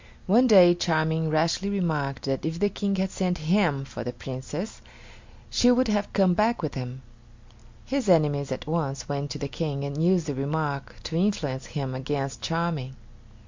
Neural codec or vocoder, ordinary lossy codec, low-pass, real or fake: none; MP3, 64 kbps; 7.2 kHz; real